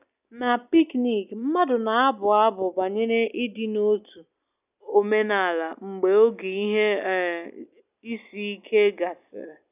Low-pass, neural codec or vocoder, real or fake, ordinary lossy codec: 3.6 kHz; none; real; none